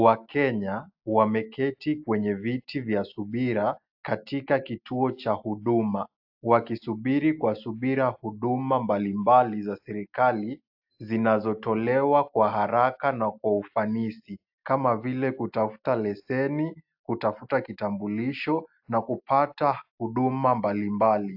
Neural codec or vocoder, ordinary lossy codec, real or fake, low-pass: none; AAC, 48 kbps; real; 5.4 kHz